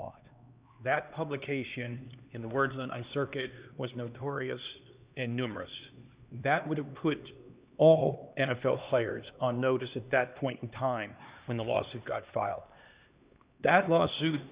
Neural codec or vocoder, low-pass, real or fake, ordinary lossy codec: codec, 16 kHz, 2 kbps, X-Codec, HuBERT features, trained on LibriSpeech; 3.6 kHz; fake; Opus, 64 kbps